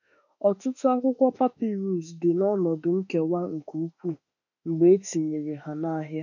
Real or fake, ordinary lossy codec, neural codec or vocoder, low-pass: fake; none; autoencoder, 48 kHz, 32 numbers a frame, DAC-VAE, trained on Japanese speech; 7.2 kHz